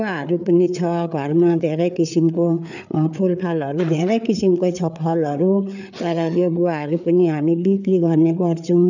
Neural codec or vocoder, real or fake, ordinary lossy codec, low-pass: codec, 16 kHz, 4 kbps, FreqCodec, larger model; fake; none; 7.2 kHz